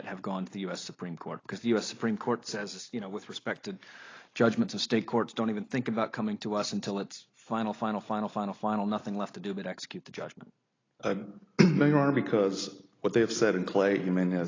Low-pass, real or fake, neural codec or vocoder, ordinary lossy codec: 7.2 kHz; real; none; AAC, 32 kbps